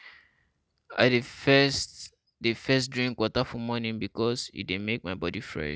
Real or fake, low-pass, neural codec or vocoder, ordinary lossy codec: real; none; none; none